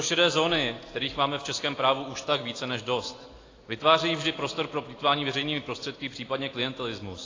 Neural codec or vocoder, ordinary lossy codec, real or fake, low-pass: none; AAC, 32 kbps; real; 7.2 kHz